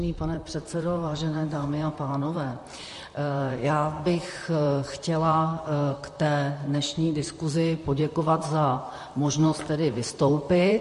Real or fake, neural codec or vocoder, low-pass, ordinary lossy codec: fake; vocoder, 44.1 kHz, 128 mel bands, Pupu-Vocoder; 14.4 kHz; MP3, 48 kbps